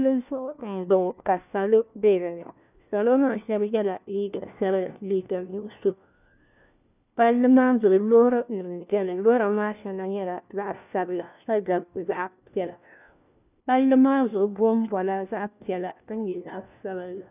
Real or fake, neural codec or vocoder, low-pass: fake; codec, 16 kHz, 1 kbps, FunCodec, trained on LibriTTS, 50 frames a second; 3.6 kHz